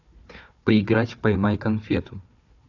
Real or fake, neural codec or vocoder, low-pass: fake; codec, 16 kHz, 4 kbps, FunCodec, trained on Chinese and English, 50 frames a second; 7.2 kHz